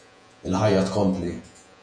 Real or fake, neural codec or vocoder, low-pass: fake; vocoder, 48 kHz, 128 mel bands, Vocos; 9.9 kHz